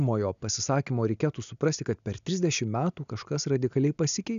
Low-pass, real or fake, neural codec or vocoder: 7.2 kHz; real; none